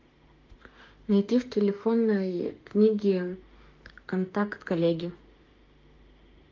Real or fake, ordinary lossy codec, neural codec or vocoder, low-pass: fake; Opus, 32 kbps; autoencoder, 48 kHz, 32 numbers a frame, DAC-VAE, trained on Japanese speech; 7.2 kHz